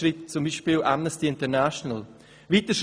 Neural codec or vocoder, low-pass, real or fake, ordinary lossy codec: none; none; real; none